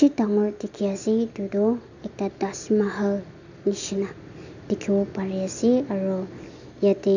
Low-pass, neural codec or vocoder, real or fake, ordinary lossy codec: 7.2 kHz; none; real; none